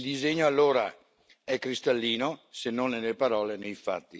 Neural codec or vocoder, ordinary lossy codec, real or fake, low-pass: none; none; real; none